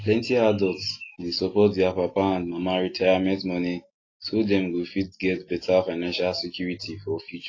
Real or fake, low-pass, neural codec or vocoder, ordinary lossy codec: real; 7.2 kHz; none; AAC, 32 kbps